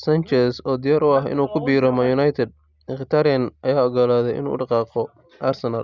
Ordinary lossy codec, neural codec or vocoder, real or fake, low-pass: Opus, 64 kbps; none; real; 7.2 kHz